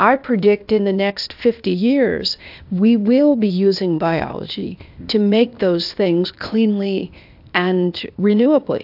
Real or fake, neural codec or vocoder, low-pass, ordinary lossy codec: fake; codec, 16 kHz, 0.8 kbps, ZipCodec; 5.4 kHz; AAC, 48 kbps